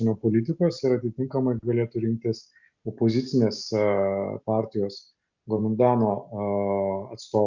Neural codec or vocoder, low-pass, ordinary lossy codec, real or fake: none; 7.2 kHz; Opus, 64 kbps; real